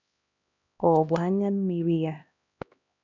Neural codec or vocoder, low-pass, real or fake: codec, 16 kHz, 1 kbps, X-Codec, HuBERT features, trained on LibriSpeech; 7.2 kHz; fake